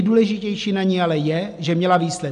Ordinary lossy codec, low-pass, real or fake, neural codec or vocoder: MP3, 96 kbps; 10.8 kHz; real; none